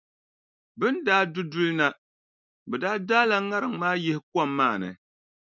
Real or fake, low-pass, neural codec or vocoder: real; 7.2 kHz; none